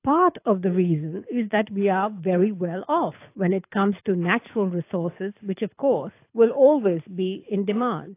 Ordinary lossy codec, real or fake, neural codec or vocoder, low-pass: AAC, 24 kbps; fake; codec, 24 kHz, 6 kbps, HILCodec; 3.6 kHz